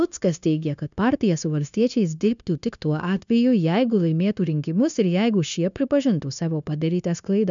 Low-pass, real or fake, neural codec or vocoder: 7.2 kHz; fake; codec, 16 kHz, 0.9 kbps, LongCat-Audio-Codec